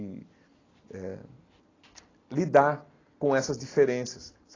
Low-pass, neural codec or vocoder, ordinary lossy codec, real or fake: 7.2 kHz; none; AAC, 32 kbps; real